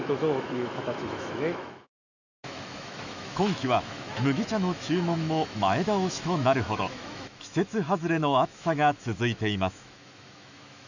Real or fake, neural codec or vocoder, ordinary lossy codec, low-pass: fake; autoencoder, 48 kHz, 128 numbers a frame, DAC-VAE, trained on Japanese speech; none; 7.2 kHz